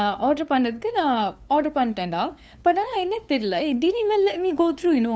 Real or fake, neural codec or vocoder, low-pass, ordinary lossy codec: fake; codec, 16 kHz, 2 kbps, FunCodec, trained on LibriTTS, 25 frames a second; none; none